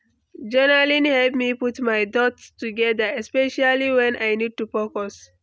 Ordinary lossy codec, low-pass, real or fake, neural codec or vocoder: none; none; real; none